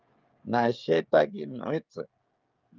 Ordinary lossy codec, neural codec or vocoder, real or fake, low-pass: Opus, 24 kbps; codec, 16 kHz, 16 kbps, FreqCodec, smaller model; fake; 7.2 kHz